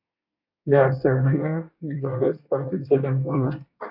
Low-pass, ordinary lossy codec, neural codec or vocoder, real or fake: 5.4 kHz; AAC, 48 kbps; codec, 24 kHz, 1 kbps, SNAC; fake